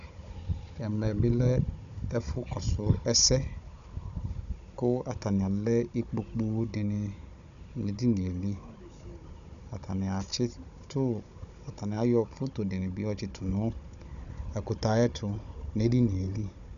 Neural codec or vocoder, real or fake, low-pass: codec, 16 kHz, 16 kbps, FunCodec, trained on Chinese and English, 50 frames a second; fake; 7.2 kHz